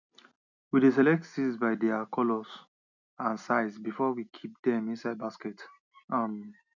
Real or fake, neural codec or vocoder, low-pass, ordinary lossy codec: real; none; 7.2 kHz; none